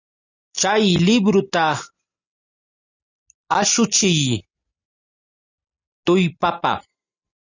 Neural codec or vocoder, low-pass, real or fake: none; 7.2 kHz; real